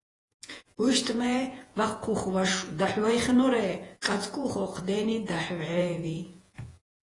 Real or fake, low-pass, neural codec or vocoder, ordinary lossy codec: fake; 10.8 kHz; vocoder, 48 kHz, 128 mel bands, Vocos; AAC, 32 kbps